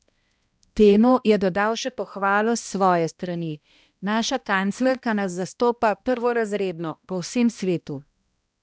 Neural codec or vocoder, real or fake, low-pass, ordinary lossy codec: codec, 16 kHz, 1 kbps, X-Codec, HuBERT features, trained on balanced general audio; fake; none; none